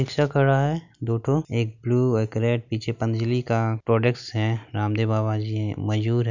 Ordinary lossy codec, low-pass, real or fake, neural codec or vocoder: none; 7.2 kHz; real; none